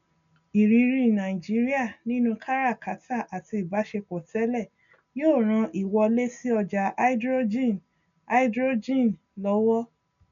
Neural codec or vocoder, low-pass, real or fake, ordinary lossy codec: none; 7.2 kHz; real; none